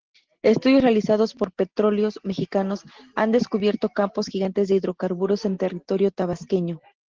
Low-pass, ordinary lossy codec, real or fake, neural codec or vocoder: 7.2 kHz; Opus, 16 kbps; real; none